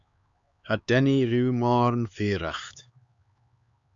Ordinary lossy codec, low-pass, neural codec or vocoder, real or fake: Opus, 64 kbps; 7.2 kHz; codec, 16 kHz, 4 kbps, X-Codec, HuBERT features, trained on LibriSpeech; fake